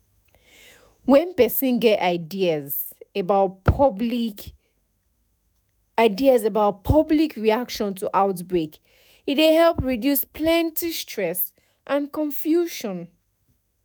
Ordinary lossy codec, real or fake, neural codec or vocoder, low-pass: none; fake; autoencoder, 48 kHz, 128 numbers a frame, DAC-VAE, trained on Japanese speech; none